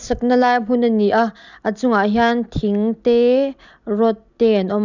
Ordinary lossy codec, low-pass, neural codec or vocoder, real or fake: none; 7.2 kHz; none; real